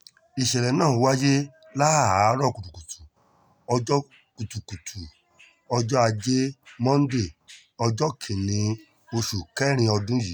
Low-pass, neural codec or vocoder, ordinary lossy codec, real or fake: none; none; none; real